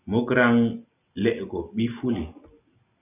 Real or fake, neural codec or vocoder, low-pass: real; none; 3.6 kHz